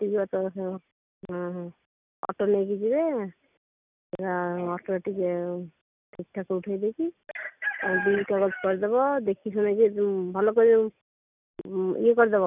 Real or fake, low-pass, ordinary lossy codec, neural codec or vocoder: real; 3.6 kHz; none; none